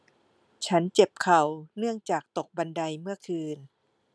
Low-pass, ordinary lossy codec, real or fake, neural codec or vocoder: none; none; real; none